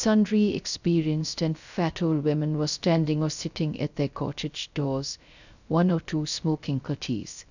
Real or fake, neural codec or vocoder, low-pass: fake; codec, 16 kHz, 0.3 kbps, FocalCodec; 7.2 kHz